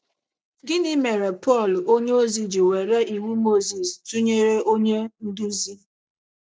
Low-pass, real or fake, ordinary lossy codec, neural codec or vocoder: none; real; none; none